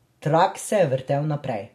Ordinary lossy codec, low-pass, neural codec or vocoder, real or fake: MP3, 64 kbps; 14.4 kHz; none; real